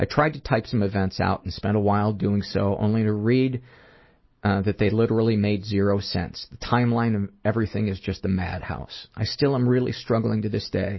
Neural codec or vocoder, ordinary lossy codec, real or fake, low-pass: none; MP3, 24 kbps; real; 7.2 kHz